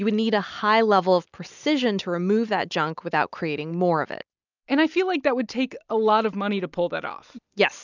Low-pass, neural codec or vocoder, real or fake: 7.2 kHz; none; real